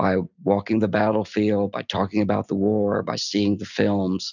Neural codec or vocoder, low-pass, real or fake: none; 7.2 kHz; real